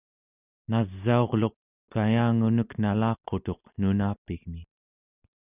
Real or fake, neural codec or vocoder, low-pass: real; none; 3.6 kHz